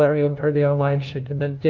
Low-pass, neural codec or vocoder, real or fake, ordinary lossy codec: 7.2 kHz; codec, 16 kHz, 0.5 kbps, FunCodec, trained on LibriTTS, 25 frames a second; fake; Opus, 24 kbps